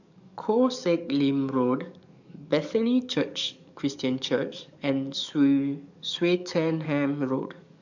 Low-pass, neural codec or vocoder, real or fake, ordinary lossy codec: 7.2 kHz; codec, 44.1 kHz, 7.8 kbps, DAC; fake; none